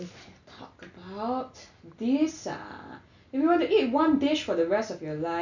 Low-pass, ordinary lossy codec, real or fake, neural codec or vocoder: 7.2 kHz; none; real; none